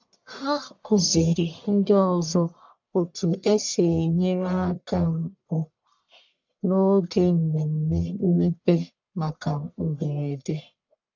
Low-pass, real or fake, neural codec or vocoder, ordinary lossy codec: 7.2 kHz; fake; codec, 44.1 kHz, 1.7 kbps, Pupu-Codec; MP3, 64 kbps